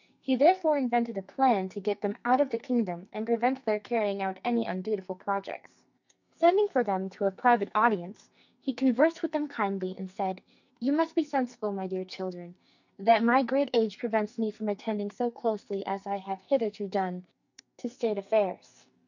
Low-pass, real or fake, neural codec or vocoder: 7.2 kHz; fake; codec, 44.1 kHz, 2.6 kbps, SNAC